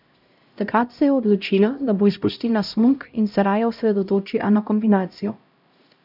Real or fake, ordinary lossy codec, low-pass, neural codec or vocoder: fake; none; 5.4 kHz; codec, 16 kHz, 0.5 kbps, X-Codec, HuBERT features, trained on LibriSpeech